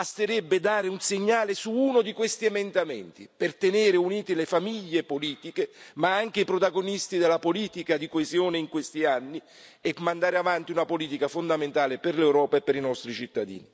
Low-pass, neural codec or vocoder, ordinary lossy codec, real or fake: none; none; none; real